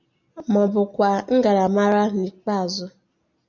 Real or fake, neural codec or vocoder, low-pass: real; none; 7.2 kHz